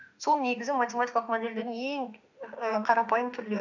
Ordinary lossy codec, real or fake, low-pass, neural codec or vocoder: none; fake; 7.2 kHz; autoencoder, 48 kHz, 32 numbers a frame, DAC-VAE, trained on Japanese speech